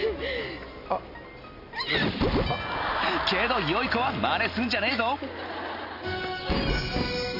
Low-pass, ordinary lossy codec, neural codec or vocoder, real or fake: 5.4 kHz; none; none; real